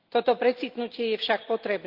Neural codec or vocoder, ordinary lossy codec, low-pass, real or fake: none; Opus, 32 kbps; 5.4 kHz; real